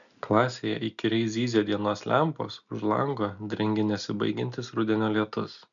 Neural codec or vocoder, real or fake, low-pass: none; real; 7.2 kHz